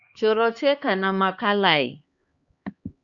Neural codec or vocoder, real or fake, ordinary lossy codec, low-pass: codec, 16 kHz, 2 kbps, X-Codec, HuBERT features, trained on LibriSpeech; fake; Opus, 64 kbps; 7.2 kHz